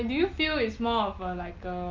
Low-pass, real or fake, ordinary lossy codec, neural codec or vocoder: 7.2 kHz; real; Opus, 32 kbps; none